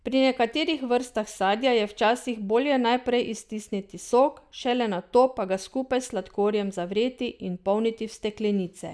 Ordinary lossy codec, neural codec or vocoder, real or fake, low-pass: none; none; real; none